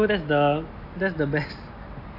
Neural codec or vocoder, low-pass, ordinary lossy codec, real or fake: none; 5.4 kHz; AAC, 32 kbps; real